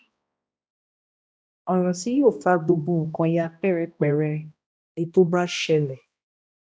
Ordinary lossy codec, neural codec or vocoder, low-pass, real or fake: none; codec, 16 kHz, 1 kbps, X-Codec, HuBERT features, trained on balanced general audio; none; fake